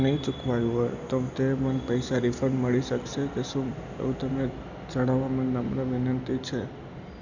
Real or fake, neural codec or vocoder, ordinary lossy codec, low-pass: real; none; none; 7.2 kHz